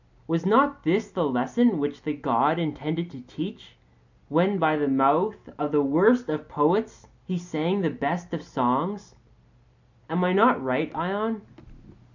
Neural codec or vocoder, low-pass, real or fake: none; 7.2 kHz; real